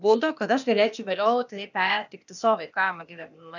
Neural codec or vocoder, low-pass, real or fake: codec, 16 kHz, 0.8 kbps, ZipCodec; 7.2 kHz; fake